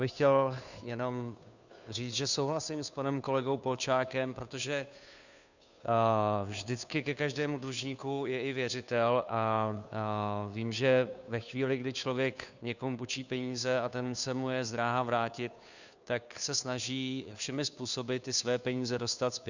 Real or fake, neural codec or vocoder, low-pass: fake; codec, 16 kHz, 2 kbps, FunCodec, trained on Chinese and English, 25 frames a second; 7.2 kHz